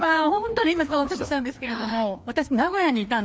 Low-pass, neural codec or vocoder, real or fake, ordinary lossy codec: none; codec, 16 kHz, 2 kbps, FreqCodec, larger model; fake; none